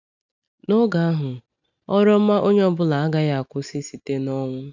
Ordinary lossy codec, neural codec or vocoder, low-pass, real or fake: none; none; 7.2 kHz; real